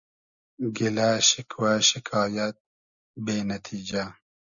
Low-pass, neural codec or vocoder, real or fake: 7.2 kHz; none; real